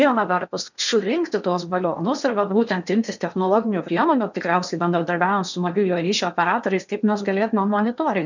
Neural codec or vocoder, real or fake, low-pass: codec, 16 kHz in and 24 kHz out, 0.8 kbps, FocalCodec, streaming, 65536 codes; fake; 7.2 kHz